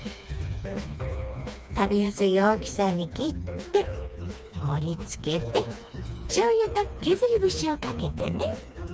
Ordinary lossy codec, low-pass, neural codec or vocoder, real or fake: none; none; codec, 16 kHz, 2 kbps, FreqCodec, smaller model; fake